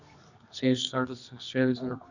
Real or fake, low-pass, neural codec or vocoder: fake; 7.2 kHz; codec, 24 kHz, 0.9 kbps, WavTokenizer, medium music audio release